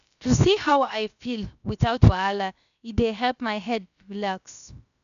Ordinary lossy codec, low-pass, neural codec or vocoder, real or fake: none; 7.2 kHz; codec, 16 kHz, about 1 kbps, DyCAST, with the encoder's durations; fake